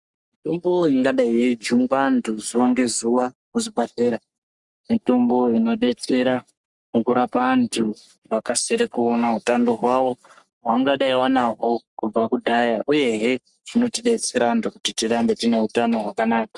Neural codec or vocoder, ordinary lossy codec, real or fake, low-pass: codec, 44.1 kHz, 3.4 kbps, Pupu-Codec; Opus, 64 kbps; fake; 10.8 kHz